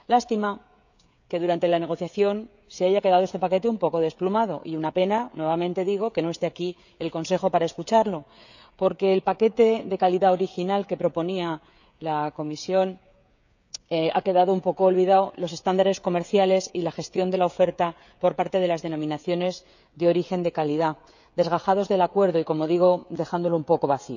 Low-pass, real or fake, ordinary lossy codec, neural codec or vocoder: 7.2 kHz; fake; none; codec, 16 kHz, 16 kbps, FreqCodec, smaller model